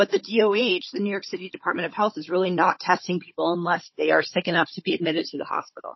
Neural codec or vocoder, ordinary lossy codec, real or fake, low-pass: codec, 16 kHz, 16 kbps, FunCodec, trained on Chinese and English, 50 frames a second; MP3, 24 kbps; fake; 7.2 kHz